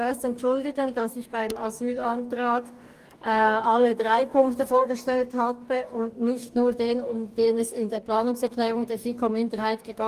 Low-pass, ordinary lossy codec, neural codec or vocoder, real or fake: 14.4 kHz; Opus, 32 kbps; codec, 44.1 kHz, 2.6 kbps, DAC; fake